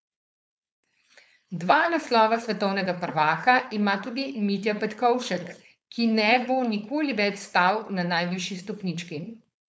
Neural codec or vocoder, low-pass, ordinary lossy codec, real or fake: codec, 16 kHz, 4.8 kbps, FACodec; none; none; fake